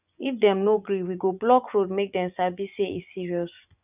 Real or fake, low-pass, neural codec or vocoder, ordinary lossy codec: fake; 3.6 kHz; vocoder, 24 kHz, 100 mel bands, Vocos; none